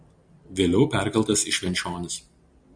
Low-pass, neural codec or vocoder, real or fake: 9.9 kHz; none; real